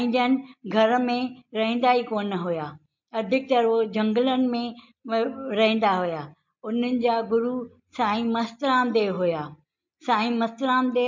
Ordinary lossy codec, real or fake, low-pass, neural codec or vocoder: MP3, 48 kbps; real; 7.2 kHz; none